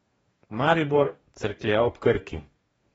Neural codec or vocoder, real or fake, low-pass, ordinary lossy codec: codec, 44.1 kHz, 2.6 kbps, DAC; fake; 19.8 kHz; AAC, 24 kbps